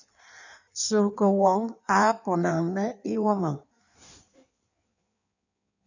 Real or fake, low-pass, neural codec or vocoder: fake; 7.2 kHz; codec, 16 kHz in and 24 kHz out, 1.1 kbps, FireRedTTS-2 codec